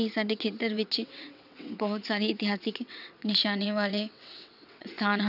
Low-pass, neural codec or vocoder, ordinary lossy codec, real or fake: 5.4 kHz; vocoder, 22.05 kHz, 80 mel bands, WaveNeXt; none; fake